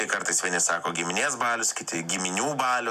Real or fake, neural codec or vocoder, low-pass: real; none; 14.4 kHz